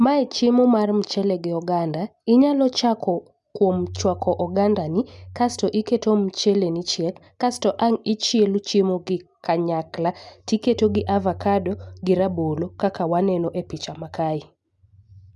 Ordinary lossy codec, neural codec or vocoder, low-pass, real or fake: none; none; none; real